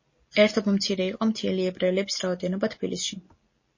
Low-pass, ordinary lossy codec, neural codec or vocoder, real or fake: 7.2 kHz; MP3, 32 kbps; none; real